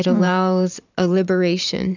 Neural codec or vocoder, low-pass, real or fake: vocoder, 44.1 kHz, 128 mel bands every 512 samples, BigVGAN v2; 7.2 kHz; fake